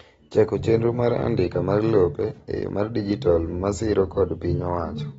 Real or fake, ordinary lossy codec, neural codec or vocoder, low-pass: real; AAC, 24 kbps; none; 10.8 kHz